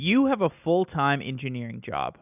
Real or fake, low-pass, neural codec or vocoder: real; 3.6 kHz; none